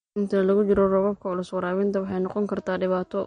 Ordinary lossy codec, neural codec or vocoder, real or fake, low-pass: MP3, 48 kbps; none; real; 9.9 kHz